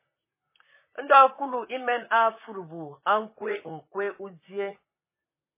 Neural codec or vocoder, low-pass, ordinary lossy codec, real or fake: vocoder, 44.1 kHz, 128 mel bands, Pupu-Vocoder; 3.6 kHz; MP3, 16 kbps; fake